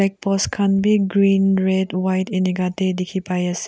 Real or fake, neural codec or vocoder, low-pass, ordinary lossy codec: real; none; none; none